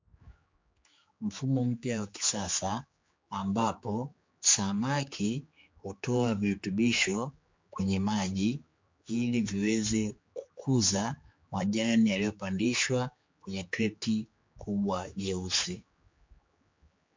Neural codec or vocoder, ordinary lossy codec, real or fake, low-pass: codec, 16 kHz, 4 kbps, X-Codec, HuBERT features, trained on general audio; MP3, 48 kbps; fake; 7.2 kHz